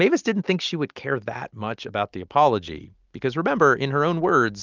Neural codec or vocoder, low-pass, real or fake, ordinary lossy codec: none; 7.2 kHz; real; Opus, 24 kbps